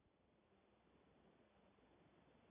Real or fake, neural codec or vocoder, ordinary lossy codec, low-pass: real; none; AAC, 32 kbps; 3.6 kHz